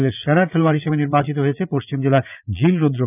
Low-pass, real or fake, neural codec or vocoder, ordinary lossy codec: 3.6 kHz; fake; vocoder, 44.1 kHz, 80 mel bands, Vocos; none